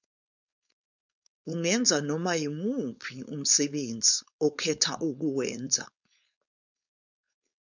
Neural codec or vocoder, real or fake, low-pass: codec, 16 kHz, 4.8 kbps, FACodec; fake; 7.2 kHz